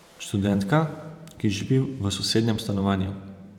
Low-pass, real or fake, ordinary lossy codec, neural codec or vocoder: 19.8 kHz; fake; none; vocoder, 44.1 kHz, 128 mel bands every 512 samples, BigVGAN v2